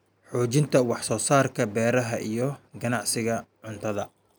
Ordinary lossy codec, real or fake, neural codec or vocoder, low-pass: none; real; none; none